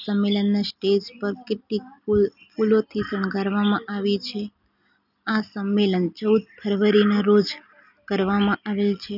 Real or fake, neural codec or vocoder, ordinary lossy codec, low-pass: real; none; none; 5.4 kHz